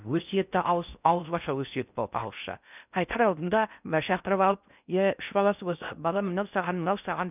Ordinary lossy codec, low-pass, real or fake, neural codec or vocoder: none; 3.6 kHz; fake; codec, 16 kHz in and 24 kHz out, 0.6 kbps, FocalCodec, streaming, 4096 codes